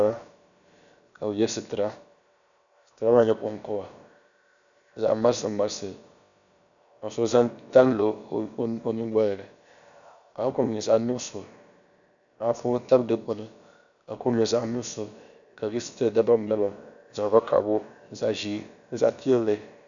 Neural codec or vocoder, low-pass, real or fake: codec, 16 kHz, about 1 kbps, DyCAST, with the encoder's durations; 7.2 kHz; fake